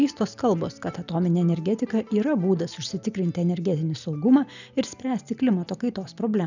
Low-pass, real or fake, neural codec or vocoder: 7.2 kHz; real; none